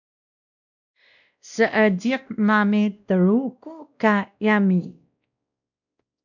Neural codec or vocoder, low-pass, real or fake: codec, 16 kHz, 1 kbps, X-Codec, WavLM features, trained on Multilingual LibriSpeech; 7.2 kHz; fake